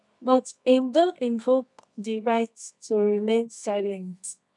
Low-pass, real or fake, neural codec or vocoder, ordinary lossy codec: 10.8 kHz; fake; codec, 24 kHz, 0.9 kbps, WavTokenizer, medium music audio release; none